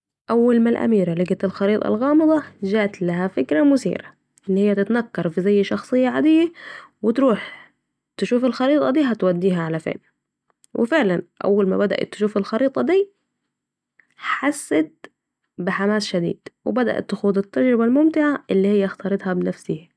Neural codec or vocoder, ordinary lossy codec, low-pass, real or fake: none; none; none; real